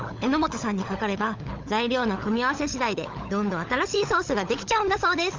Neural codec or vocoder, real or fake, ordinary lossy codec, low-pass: codec, 16 kHz, 16 kbps, FunCodec, trained on LibriTTS, 50 frames a second; fake; Opus, 32 kbps; 7.2 kHz